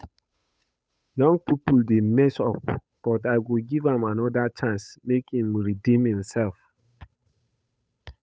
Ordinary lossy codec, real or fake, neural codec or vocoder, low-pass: none; fake; codec, 16 kHz, 8 kbps, FunCodec, trained on Chinese and English, 25 frames a second; none